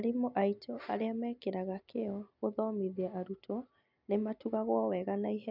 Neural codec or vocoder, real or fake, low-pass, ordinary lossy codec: none; real; 5.4 kHz; none